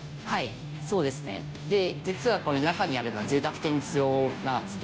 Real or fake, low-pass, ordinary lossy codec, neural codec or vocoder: fake; none; none; codec, 16 kHz, 0.5 kbps, FunCodec, trained on Chinese and English, 25 frames a second